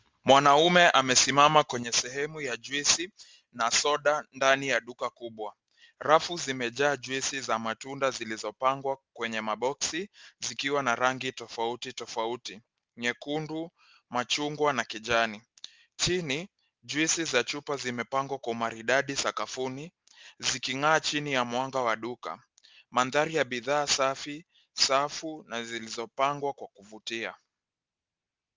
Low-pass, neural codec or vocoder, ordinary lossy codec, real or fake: 7.2 kHz; none; Opus, 32 kbps; real